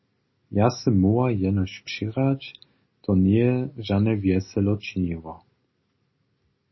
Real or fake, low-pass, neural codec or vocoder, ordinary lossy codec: real; 7.2 kHz; none; MP3, 24 kbps